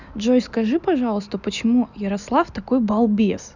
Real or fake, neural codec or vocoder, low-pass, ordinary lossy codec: real; none; 7.2 kHz; none